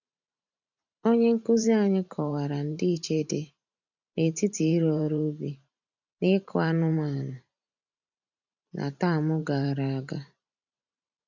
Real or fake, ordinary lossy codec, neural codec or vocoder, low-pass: fake; none; vocoder, 44.1 kHz, 128 mel bands every 512 samples, BigVGAN v2; 7.2 kHz